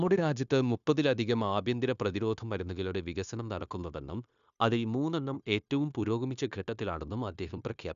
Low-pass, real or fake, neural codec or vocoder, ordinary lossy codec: 7.2 kHz; fake; codec, 16 kHz, 0.9 kbps, LongCat-Audio-Codec; none